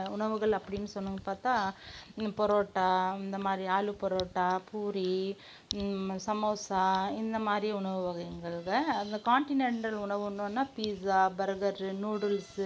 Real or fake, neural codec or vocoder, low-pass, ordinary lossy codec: real; none; none; none